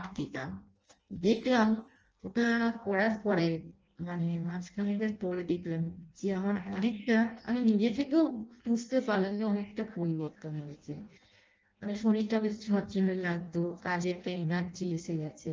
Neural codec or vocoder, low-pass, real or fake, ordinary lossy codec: codec, 16 kHz in and 24 kHz out, 0.6 kbps, FireRedTTS-2 codec; 7.2 kHz; fake; Opus, 24 kbps